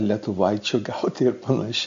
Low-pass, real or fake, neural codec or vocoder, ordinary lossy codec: 7.2 kHz; real; none; MP3, 48 kbps